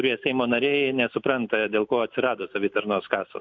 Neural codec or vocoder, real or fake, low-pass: none; real; 7.2 kHz